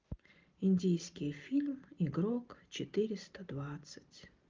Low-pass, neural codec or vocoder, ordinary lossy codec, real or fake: 7.2 kHz; none; Opus, 32 kbps; real